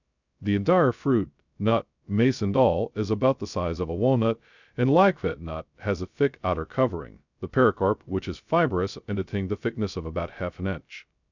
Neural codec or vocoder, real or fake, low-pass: codec, 16 kHz, 0.2 kbps, FocalCodec; fake; 7.2 kHz